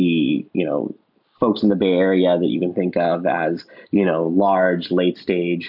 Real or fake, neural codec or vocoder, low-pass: real; none; 5.4 kHz